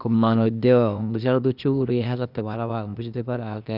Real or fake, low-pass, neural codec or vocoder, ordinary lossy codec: fake; 5.4 kHz; codec, 16 kHz, 0.8 kbps, ZipCodec; none